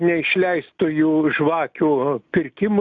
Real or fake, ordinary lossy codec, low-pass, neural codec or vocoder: real; AAC, 64 kbps; 7.2 kHz; none